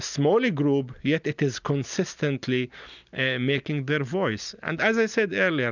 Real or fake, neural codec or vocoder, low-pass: real; none; 7.2 kHz